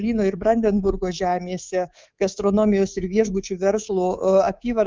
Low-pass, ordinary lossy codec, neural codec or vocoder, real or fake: 7.2 kHz; Opus, 32 kbps; codec, 24 kHz, 3.1 kbps, DualCodec; fake